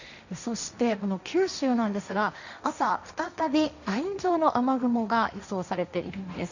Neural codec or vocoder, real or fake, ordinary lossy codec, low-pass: codec, 16 kHz, 1.1 kbps, Voila-Tokenizer; fake; none; 7.2 kHz